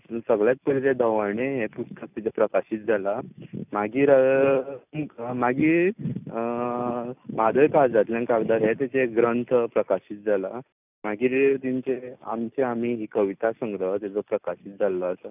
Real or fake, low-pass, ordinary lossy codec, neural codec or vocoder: real; 3.6 kHz; none; none